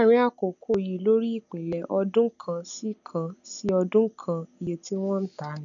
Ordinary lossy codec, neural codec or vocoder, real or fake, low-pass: none; none; real; 7.2 kHz